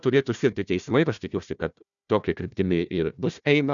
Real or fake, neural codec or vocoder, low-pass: fake; codec, 16 kHz, 1 kbps, FunCodec, trained on Chinese and English, 50 frames a second; 7.2 kHz